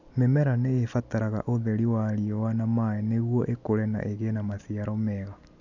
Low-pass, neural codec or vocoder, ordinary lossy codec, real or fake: 7.2 kHz; none; none; real